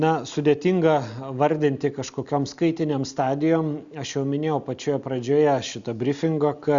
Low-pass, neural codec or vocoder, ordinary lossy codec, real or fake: 7.2 kHz; none; Opus, 64 kbps; real